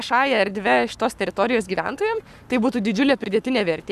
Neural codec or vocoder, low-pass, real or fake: codec, 44.1 kHz, 7.8 kbps, Pupu-Codec; 14.4 kHz; fake